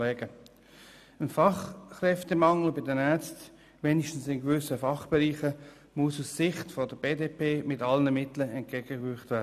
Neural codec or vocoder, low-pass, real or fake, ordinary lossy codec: none; 14.4 kHz; real; none